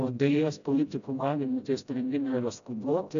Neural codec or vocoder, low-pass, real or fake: codec, 16 kHz, 0.5 kbps, FreqCodec, smaller model; 7.2 kHz; fake